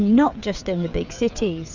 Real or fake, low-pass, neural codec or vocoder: fake; 7.2 kHz; codec, 16 kHz, 16 kbps, FreqCodec, smaller model